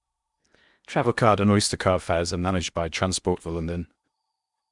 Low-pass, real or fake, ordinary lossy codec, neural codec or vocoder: 10.8 kHz; fake; Opus, 64 kbps; codec, 16 kHz in and 24 kHz out, 0.8 kbps, FocalCodec, streaming, 65536 codes